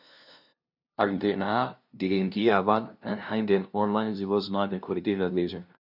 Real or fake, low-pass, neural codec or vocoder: fake; 5.4 kHz; codec, 16 kHz, 0.5 kbps, FunCodec, trained on LibriTTS, 25 frames a second